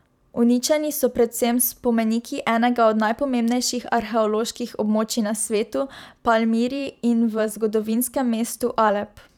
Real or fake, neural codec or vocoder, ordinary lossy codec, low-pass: fake; vocoder, 44.1 kHz, 128 mel bands every 512 samples, BigVGAN v2; none; 19.8 kHz